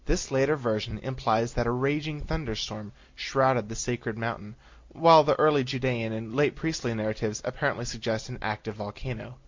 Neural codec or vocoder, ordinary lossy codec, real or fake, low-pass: none; MP3, 48 kbps; real; 7.2 kHz